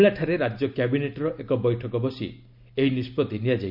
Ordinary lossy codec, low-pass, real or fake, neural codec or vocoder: none; 5.4 kHz; real; none